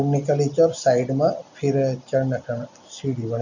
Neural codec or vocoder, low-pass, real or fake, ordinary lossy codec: none; 7.2 kHz; real; none